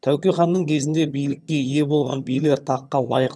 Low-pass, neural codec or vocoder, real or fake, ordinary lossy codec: none; vocoder, 22.05 kHz, 80 mel bands, HiFi-GAN; fake; none